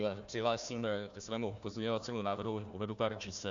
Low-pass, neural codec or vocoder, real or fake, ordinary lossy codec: 7.2 kHz; codec, 16 kHz, 1 kbps, FunCodec, trained on Chinese and English, 50 frames a second; fake; Opus, 64 kbps